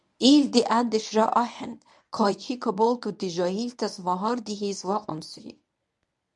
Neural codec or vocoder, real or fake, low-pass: codec, 24 kHz, 0.9 kbps, WavTokenizer, medium speech release version 1; fake; 10.8 kHz